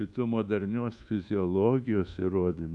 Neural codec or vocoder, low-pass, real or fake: codec, 24 kHz, 1.2 kbps, DualCodec; 10.8 kHz; fake